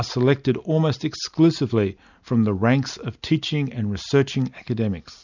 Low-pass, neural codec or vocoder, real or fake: 7.2 kHz; none; real